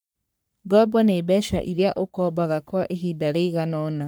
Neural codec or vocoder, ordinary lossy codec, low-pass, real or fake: codec, 44.1 kHz, 3.4 kbps, Pupu-Codec; none; none; fake